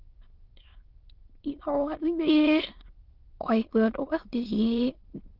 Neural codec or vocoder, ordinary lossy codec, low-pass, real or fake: autoencoder, 22.05 kHz, a latent of 192 numbers a frame, VITS, trained on many speakers; Opus, 16 kbps; 5.4 kHz; fake